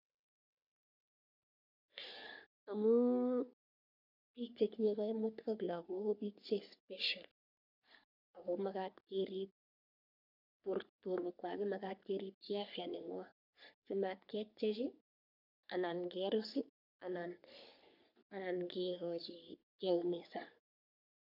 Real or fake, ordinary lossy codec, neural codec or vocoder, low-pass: fake; none; codec, 44.1 kHz, 3.4 kbps, Pupu-Codec; 5.4 kHz